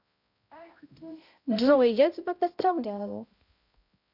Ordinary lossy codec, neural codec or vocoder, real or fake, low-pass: none; codec, 16 kHz, 0.5 kbps, X-Codec, HuBERT features, trained on balanced general audio; fake; 5.4 kHz